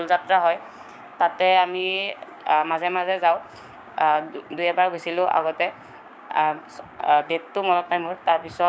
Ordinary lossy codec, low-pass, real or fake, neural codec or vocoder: none; none; fake; codec, 16 kHz, 6 kbps, DAC